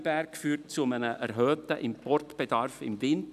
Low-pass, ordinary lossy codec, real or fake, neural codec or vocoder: 14.4 kHz; none; real; none